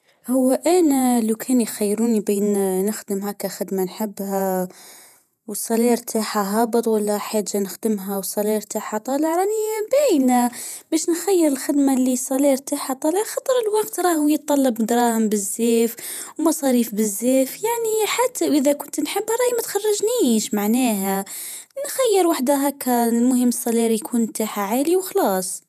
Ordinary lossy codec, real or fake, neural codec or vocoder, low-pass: none; fake; vocoder, 48 kHz, 128 mel bands, Vocos; 14.4 kHz